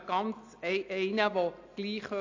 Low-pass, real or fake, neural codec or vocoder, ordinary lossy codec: 7.2 kHz; real; none; AAC, 48 kbps